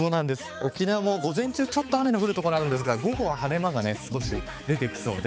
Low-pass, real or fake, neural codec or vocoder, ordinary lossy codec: none; fake; codec, 16 kHz, 4 kbps, X-Codec, HuBERT features, trained on balanced general audio; none